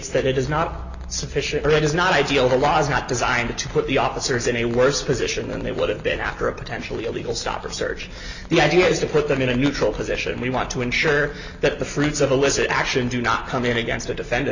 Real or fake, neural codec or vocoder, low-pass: real; none; 7.2 kHz